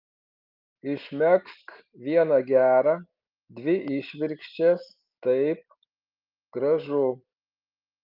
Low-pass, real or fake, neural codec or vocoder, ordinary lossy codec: 5.4 kHz; real; none; Opus, 32 kbps